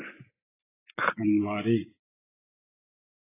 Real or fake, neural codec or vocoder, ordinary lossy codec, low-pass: fake; vocoder, 24 kHz, 100 mel bands, Vocos; AAC, 16 kbps; 3.6 kHz